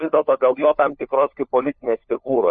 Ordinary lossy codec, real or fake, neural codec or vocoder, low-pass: MP3, 32 kbps; fake; codec, 16 kHz, 4 kbps, FunCodec, trained on LibriTTS, 50 frames a second; 7.2 kHz